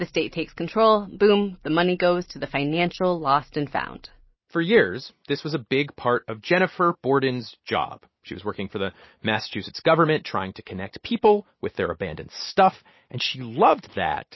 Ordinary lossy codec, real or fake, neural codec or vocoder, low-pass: MP3, 24 kbps; real; none; 7.2 kHz